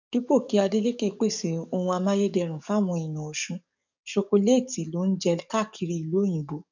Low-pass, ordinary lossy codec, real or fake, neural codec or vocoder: 7.2 kHz; none; fake; codec, 44.1 kHz, 7.8 kbps, DAC